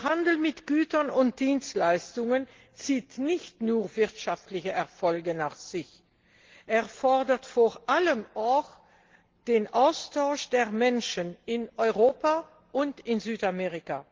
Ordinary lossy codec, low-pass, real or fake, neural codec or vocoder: Opus, 16 kbps; 7.2 kHz; real; none